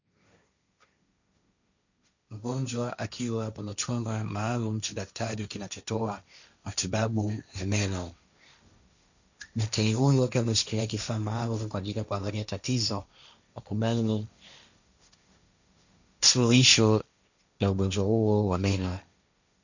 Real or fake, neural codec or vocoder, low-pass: fake; codec, 16 kHz, 1.1 kbps, Voila-Tokenizer; 7.2 kHz